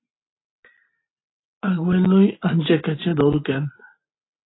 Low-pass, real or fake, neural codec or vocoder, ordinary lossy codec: 7.2 kHz; real; none; AAC, 16 kbps